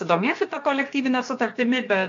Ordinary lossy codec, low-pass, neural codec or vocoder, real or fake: AAC, 48 kbps; 7.2 kHz; codec, 16 kHz, about 1 kbps, DyCAST, with the encoder's durations; fake